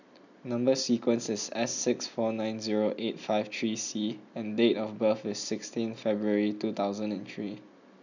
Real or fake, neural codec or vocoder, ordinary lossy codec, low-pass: real; none; none; 7.2 kHz